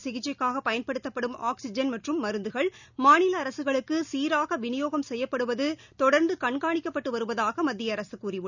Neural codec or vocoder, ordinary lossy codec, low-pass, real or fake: none; none; 7.2 kHz; real